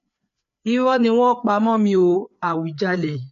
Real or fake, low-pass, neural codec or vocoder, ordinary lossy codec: fake; 7.2 kHz; codec, 16 kHz, 4 kbps, FreqCodec, larger model; MP3, 48 kbps